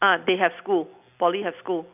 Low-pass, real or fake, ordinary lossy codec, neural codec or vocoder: 3.6 kHz; real; none; none